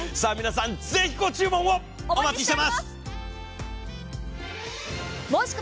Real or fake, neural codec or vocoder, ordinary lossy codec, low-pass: real; none; none; none